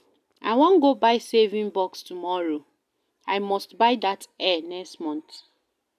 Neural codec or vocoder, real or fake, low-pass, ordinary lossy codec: none; real; 14.4 kHz; none